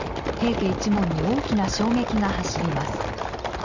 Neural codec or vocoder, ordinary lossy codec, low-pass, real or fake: none; Opus, 64 kbps; 7.2 kHz; real